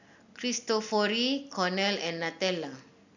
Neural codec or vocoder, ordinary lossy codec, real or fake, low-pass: none; none; real; 7.2 kHz